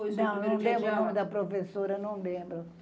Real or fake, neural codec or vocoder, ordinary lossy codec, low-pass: real; none; none; none